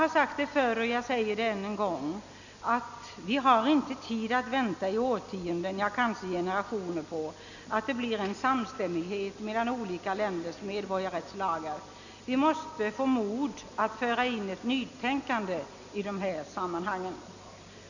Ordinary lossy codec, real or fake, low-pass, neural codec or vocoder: none; real; 7.2 kHz; none